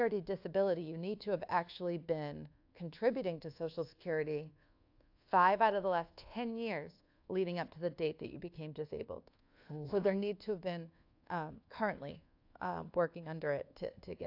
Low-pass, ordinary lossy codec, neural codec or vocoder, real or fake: 5.4 kHz; AAC, 48 kbps; codec, 24 kHz, 1.2 kbps, DualCodec; fake